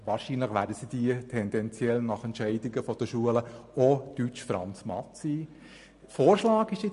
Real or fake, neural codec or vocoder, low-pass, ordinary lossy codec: real; none; 10.8 kHz; MP3, 48 kbps